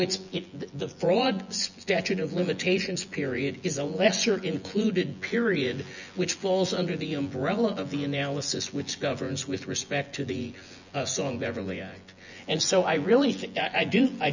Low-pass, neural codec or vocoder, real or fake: 7.2 kHz; vocoder, 24 kHz, 100 mel bands, Vocos; fake